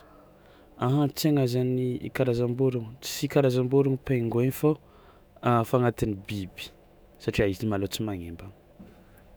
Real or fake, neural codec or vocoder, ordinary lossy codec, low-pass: fake; autoencoder, 48 kHz, 128 numbers a frame, DAC-VAE, trained on Japanese speech; none; none